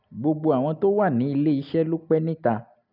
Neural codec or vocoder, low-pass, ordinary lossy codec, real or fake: none; 5.4 kHz; none; real